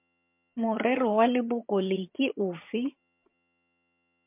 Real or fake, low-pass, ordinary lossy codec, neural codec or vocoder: fake; 3.6 kHz; MP3, 32 kbps; vocoder, 22.05 kHz, 80 mel bands, HiFi-GAN